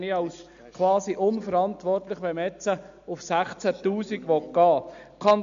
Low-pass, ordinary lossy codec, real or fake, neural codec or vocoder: 7.2 kHz; MP3, 64 kbps; real; none